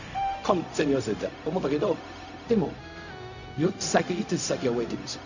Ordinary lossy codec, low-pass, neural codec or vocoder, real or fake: none; 7.2 kHz; codec, 16 kHz, 0.4 kbps, LongCat-Audio-Codec; fake